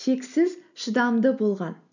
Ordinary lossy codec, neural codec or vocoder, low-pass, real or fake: none; none; 7.2 kHz; real